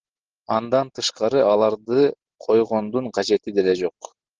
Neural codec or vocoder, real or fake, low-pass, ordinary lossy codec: none; real; 7.2 kHz; Opus, 16 kbps